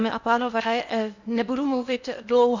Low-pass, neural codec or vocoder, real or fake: 7.2 kHz; codec, 16 kHz in and 24 kHz out, 0.8 kbps, FocalCodec, streaming, 65536 codes; fake